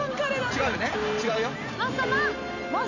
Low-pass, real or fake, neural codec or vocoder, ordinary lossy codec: 7.2 kHz; real; none; none